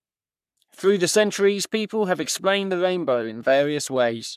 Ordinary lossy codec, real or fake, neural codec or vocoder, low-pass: none; fake; codec, 44.1 kHz, 3.4 kbps, Pupu-Codec; 14.4 kHz